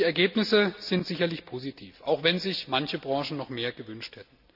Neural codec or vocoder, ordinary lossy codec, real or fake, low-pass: none; none; real; 5.4 kHz